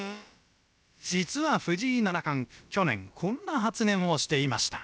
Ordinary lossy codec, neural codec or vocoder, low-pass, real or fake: none; codec, 16 kHz, about 1 kbps, DyCAST, with the encoder's durations; none; fake